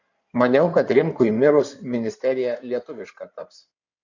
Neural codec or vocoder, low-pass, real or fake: codec, 16 kHz in and 24 kHz out, 2.2 kbps, FireRedTTS-2 codec; 7.2 kHz; fake